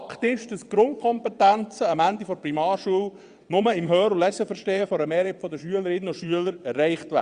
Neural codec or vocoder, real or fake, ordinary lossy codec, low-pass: vocoder, 22.05 kHz, 80 mel bands, WaveNeXt; fake; Opus, 64 kbps; 9.9 kHz